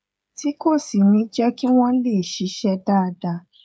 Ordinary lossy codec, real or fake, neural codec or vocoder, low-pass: none; fake; codec, 16 kHz, 16 kbps, FreqCodec, smaller model; none